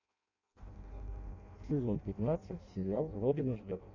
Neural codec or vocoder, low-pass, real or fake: codec, 16 kHz in and 24 kHz out, 0.6 kbps, FireRedTTS-2 codec; 7.2 kHz; fake